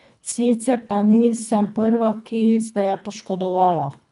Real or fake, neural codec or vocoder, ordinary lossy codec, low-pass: fake; codec, 24 kHz, 1.5 kbps, HILCodec; none; 10.8 kHz